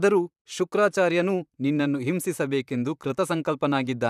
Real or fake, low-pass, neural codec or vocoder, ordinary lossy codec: fake; 14.4 kHz; vocoder, 44.1 kHz, 128 mel bands, Pupu-Vocoder; none